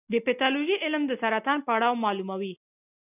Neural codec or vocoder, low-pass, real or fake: none; 3.6 kHz; real